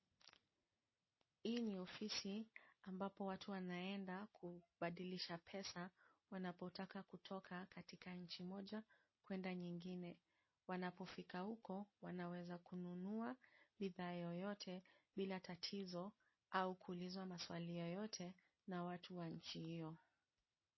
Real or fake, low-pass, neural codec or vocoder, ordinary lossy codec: real; 7.2 kHz; none; MP3, 24 kbps